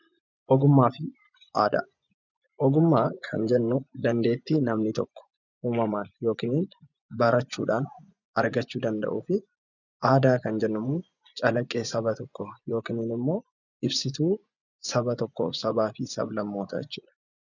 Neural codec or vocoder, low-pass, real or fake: vocoder, 24 kHz, 100 mel bands, Vocos; 7.2 kHz; fake